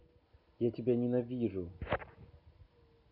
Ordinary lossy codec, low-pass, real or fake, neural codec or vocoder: none; 5.4 kHz; real; none